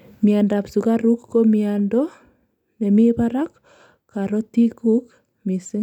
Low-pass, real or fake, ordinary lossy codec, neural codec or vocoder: 19.8 kHz; real; none; none